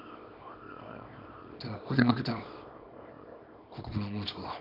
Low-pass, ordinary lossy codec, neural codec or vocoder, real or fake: 5.4 kHz; none; codec, 24 kHz, 0.9 kbps, WavTokenizer, small release; fake